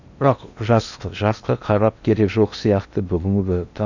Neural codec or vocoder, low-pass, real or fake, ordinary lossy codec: codec, 16 kHz in and 24 kHz out, 0.8 kbps, FocalCodec, streaming, 65536 codes; 7.2 kHz; fake; none